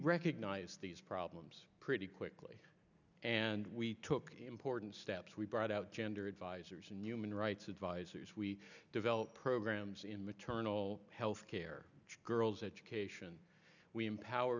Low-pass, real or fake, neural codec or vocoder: 7.2 kHz; real; none